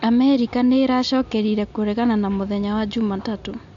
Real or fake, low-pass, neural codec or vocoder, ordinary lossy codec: real; 7.2 kHz; none; AAC, 64 kbps